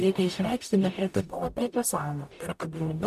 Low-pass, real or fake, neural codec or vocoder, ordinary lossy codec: 14.4 kHz; fake; codec, 44.1 kHz, 0.9 kbps, DAC; AAC, 96 kbps